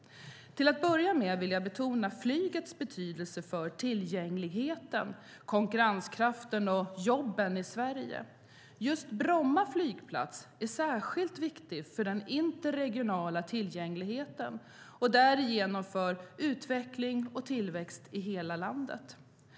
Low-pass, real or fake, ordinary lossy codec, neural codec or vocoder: none; real; none; none